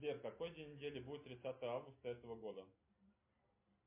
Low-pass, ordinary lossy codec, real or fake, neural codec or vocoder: 3.6 kHz; MP3, 24 kbps; real; none